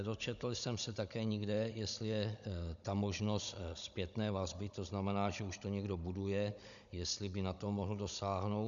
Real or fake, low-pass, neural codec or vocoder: fake; 7.2 kHz; codec, 16 kHz, 16 kbps, FunCodec, trained on Chinese and English, 50 frames a second